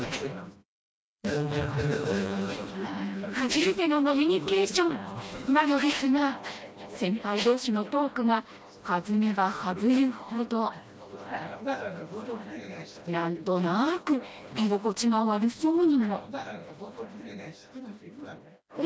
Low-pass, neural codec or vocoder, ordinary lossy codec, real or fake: none; codec, 16 kHz, 1 kbps, FreqCodec, smaller model; none; fake